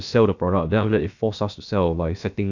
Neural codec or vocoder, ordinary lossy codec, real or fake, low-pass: codec, 16 kHz, about 1 kbps, DyCAST, with the encoder's durations; none; fake; 7.2 kHz